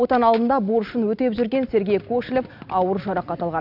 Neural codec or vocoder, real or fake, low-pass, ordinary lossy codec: none; real; 5.4 kHz; none